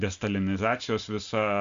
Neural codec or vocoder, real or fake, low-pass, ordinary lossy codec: none; real; 7.2 kHz; Opus, 64 kbps